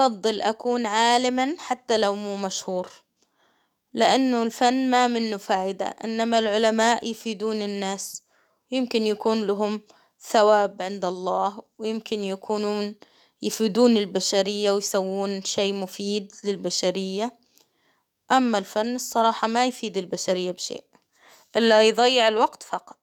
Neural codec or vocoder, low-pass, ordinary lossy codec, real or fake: codec, 44.1 kHz, 7.8 kbps, DAC; 19.8 kHz; none; fake